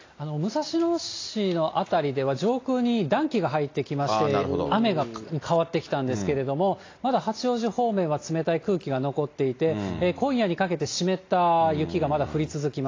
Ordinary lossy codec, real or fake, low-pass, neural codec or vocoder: AAC, 32 kbps; real; 7.2 kHz; none